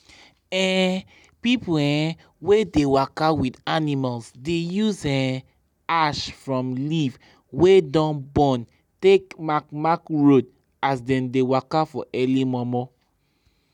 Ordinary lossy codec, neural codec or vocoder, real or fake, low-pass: none; none; real; 19.8 kHz